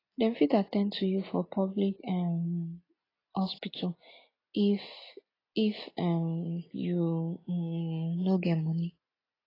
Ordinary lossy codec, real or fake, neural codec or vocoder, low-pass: AAC, 24 kbps; real; none; 5.4 kHz